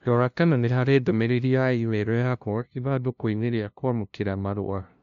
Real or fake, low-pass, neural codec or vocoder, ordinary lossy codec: fake; 7.2 kHz; codec, 16 kHz, 0.5 kbps, FunCodec, trained on LibriTTS, 25 frames a second; none